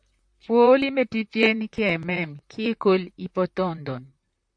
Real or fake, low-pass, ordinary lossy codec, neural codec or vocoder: fake; 9.9 kHz; AAC, 64 kbps; vocoder, 44.1 kHz, 128 mel bands, Pupu-Vocoder